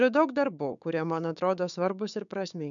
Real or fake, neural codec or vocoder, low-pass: fake; codec, 16 kHz, 6 kbps, DAC; 7.2 kHz